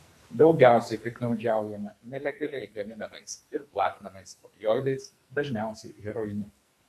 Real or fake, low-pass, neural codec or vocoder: fake; 14.4 kHz; codec, 44.1 kHz, 2.6 kbps, SNAC